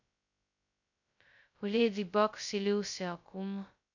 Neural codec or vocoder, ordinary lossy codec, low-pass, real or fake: codec, 16 kHz, 0.2 kbps, FocalCodec; none; 7.2 kHz; fake